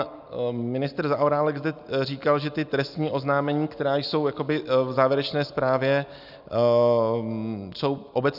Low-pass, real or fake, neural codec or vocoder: 5.4 kHz; real; none